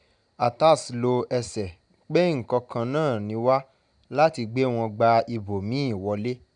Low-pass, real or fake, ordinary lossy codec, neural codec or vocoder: 9.9 kHz; real; none; none